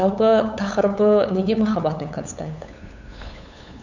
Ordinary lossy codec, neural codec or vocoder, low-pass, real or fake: none; codec, 16 kHz, 8 kbps, FunCodec, trained on LibriTTS, 25 frames a second; 7.2 kHz; fake